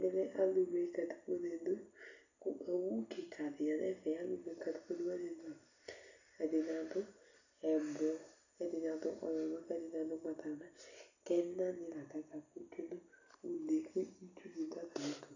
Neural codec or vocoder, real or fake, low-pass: none; real; 7.2 kHz